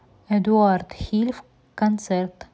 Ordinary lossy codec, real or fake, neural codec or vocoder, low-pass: none; real; none; none